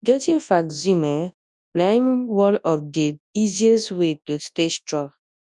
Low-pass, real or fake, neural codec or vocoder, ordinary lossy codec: 10.8 kHz; fake; codec, 24 kHz, 0.9 kbps, WavTokenizer, large speech release; none